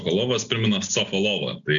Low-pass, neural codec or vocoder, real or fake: 7.2 kHz; none; real